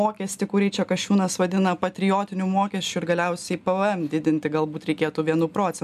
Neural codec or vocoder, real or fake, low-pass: none; real; 14.4 kHz